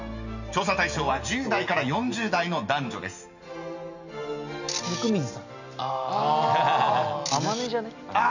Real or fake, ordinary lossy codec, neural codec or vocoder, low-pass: real; none; none; 7.2 kHz